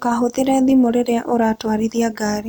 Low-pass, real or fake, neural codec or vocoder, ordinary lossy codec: 19.8 kHz; real; none; none